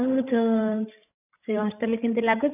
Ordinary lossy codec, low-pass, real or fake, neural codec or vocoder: none; 3.6 kHz; fake; codec, 16 kHz, 16 kbps, FreqCodec, larger model